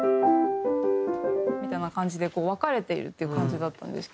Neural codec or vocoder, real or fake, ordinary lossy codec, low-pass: none; real; none; none